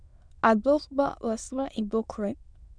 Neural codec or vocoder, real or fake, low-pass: autoencoder, 22.05 kHz, a latent of 192 numbers a frame, VITS, trained on many speakers; fake; 9.9 kHz